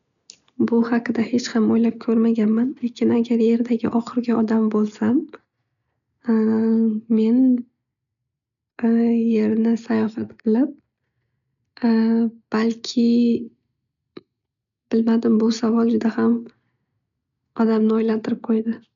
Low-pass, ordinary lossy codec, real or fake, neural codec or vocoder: 7.2 kHz; none; real; none